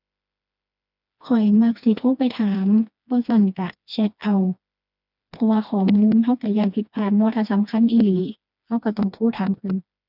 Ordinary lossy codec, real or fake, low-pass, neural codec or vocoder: none; fake; 5.4 kHz; codec, 16 kHz, 2 kbps, FreqCodec, smaller model